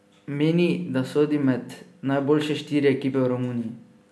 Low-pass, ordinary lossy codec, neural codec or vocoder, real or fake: none; none; none; real